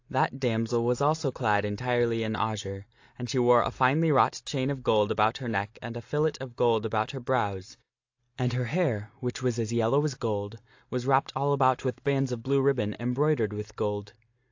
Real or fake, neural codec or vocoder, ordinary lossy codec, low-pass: real; none; AAC, 48 kbps; 7.2 kHz